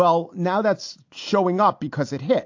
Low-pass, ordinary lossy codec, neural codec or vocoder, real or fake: 7.2 kHz; AAC, 48 kbps; none; real